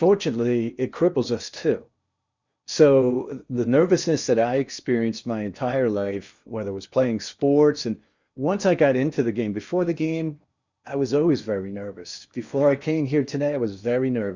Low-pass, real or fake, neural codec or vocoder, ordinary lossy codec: 7.2 kHz; fake; codec, 16 kHz in and 24 kHz out, 0.8 kbps, FocalCodec, streaming, 65536 codes; Opus, 64 kbps